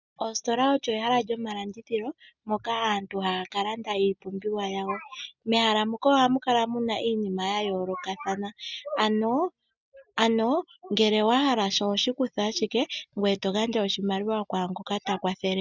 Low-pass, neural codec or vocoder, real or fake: 7.2 kHz; none; real